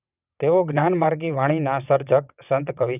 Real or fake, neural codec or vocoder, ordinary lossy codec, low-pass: fake; vocoder, 22.05 kHz, 80 mel bands, WaveNeXt; none; 3.6 kHz